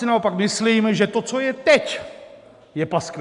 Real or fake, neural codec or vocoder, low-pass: real; none; 10.8 kHz